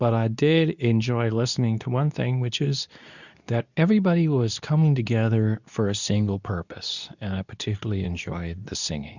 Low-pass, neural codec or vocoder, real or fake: 7.2 kHz; codec, 24 kHz, 0.9 kbps, WavTokenizer, medium speech release version 2; fake